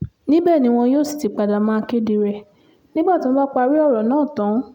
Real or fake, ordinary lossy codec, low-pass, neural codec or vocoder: real; none; 19.8 kHz; none